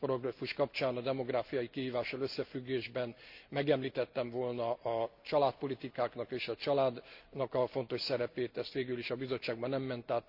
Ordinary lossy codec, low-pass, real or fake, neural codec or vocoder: Opus, 64 kbps; 5.4 kHz; real; none